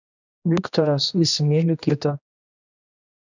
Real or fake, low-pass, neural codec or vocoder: fake; 7.2 kHz; codec, 16 kHz, 1 kbps, X-Codec, HuBERT features, trained on general audio